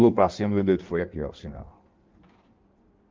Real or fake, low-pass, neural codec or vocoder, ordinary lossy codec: fake; 7.2 kHz; codec, 16 kHz in and 24 kHz out, 1.1 kbps, FireRedTTS-2 codec; Opus, 32 kbps